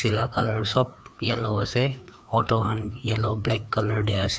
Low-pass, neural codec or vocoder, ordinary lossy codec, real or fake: none; codec, 16 kHz, 2 kbps, FreqCodec, larger model; none; fake